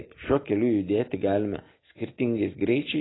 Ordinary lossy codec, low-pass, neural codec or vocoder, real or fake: AAC, 16 kbps; 7.2 kHz; none; real